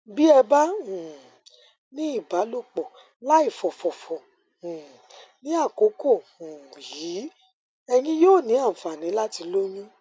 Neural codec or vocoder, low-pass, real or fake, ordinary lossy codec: none; none; real; none